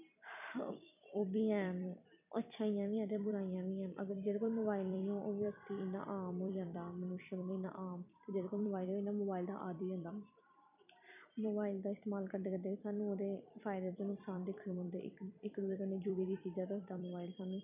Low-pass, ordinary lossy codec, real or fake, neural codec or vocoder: 3.6 kHz; none; fake; vocoder, 44.1 kHz, 128 mel bands every 256 samples, BigVGAN v2